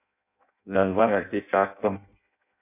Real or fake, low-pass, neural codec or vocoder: fake; 3.6 kHz; codec, 16 kHz in and 24 kHz out, 0.6 kbps, FireRedTTS-2 codec